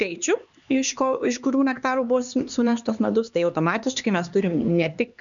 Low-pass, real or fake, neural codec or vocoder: 7.2 kHz; fake; codec, 16 kHz, 2 kbps, X-Codec, HuBERT features, trained on LibriSpeech